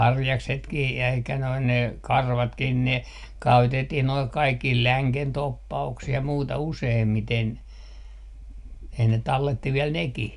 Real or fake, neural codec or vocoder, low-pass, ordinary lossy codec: real; none; 10.8 kHz; none